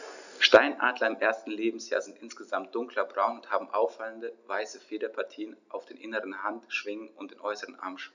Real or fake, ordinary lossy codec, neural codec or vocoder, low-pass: real; none; none; 7.2 kHz